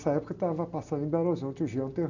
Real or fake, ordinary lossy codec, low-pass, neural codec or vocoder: real; none; 7.2 kHz; none